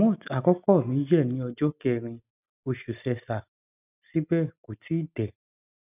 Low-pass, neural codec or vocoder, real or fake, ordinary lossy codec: 3.6 kHz; none; real; none